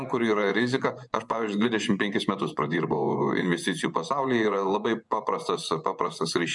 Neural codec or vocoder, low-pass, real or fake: vocoder, 24 kHz, 100 mel bands, Vocos; 10.8 kHz; fake